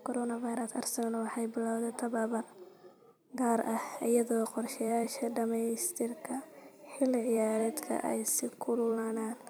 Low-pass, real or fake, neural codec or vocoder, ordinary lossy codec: none; fake; vocoder, 44.1 kHz, 128 mel bands every 256 samples, BigVGAN v2; none